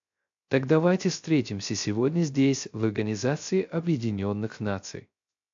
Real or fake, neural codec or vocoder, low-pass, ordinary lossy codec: fake; codec, 16 kHz, 0.3 kbps, FocalCodec; 7.2 kHz; AAC, 64 kbps